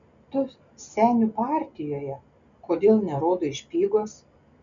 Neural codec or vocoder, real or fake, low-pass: none; real; 7.2 kHz